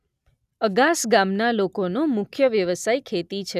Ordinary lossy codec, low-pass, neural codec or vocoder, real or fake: none; 14.4 kHz; none; real